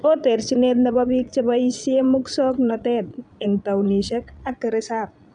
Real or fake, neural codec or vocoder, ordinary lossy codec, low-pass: fake; vocoder, 22.05 kHz, 80 mel bands, Vocos; none; 9.9 kHz